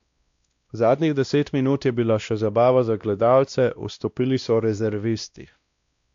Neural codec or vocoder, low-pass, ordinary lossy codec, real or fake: codec, 16 kHz, 1 kbps, X-Codec, WavLM features, trained on Multilingual LibriSpeech; 7.2 kHz; AAC, 64 kbps; fake